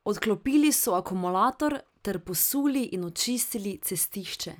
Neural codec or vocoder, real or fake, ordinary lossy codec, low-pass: none; real; none; none